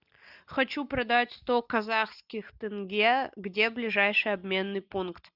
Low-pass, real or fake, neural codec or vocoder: 5.4 kHz; real; none